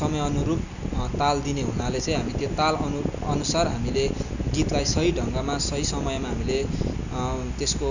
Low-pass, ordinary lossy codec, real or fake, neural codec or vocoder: 7.2 kHz; none; real; none